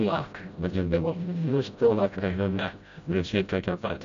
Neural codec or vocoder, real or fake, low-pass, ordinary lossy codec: codec, 16 kHz, 0.5 kbps, FreqCodec, smaller model; fake; 7.2 kHz; MP3, 64 kbps